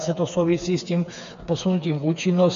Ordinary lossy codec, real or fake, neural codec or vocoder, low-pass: MP3, 64 kbps; fake; codec, 16 kHz, 4 kbps, FreqCodec, smaller model; 7.2 kHz